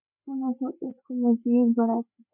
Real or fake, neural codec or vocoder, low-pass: fake; codec, 16 kHz, 4 kbps, FreqCodec, larger model; 3.6 kHz